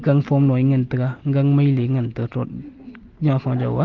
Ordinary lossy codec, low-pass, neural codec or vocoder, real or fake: Opus, 16 kbps; 7.2 kHz; none; real